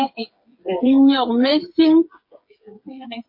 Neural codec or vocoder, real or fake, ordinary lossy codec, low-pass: codec, 16 kHz, 8 kbps, FreqCodec, smaller model; fake; MP3, 32 kbps; 5.4 kHz